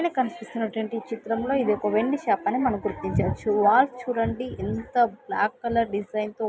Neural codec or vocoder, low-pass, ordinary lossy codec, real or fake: none; none; none; real